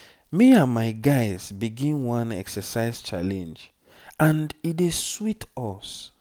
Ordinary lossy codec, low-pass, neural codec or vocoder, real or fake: none; none; none; real